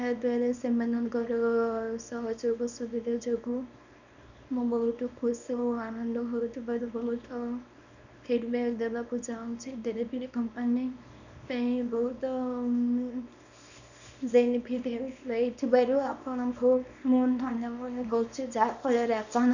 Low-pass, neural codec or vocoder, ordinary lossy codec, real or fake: 7.2 kHz; codec, 24 kHz, 0.9 kbps, WavTokenizer, small release; none; fake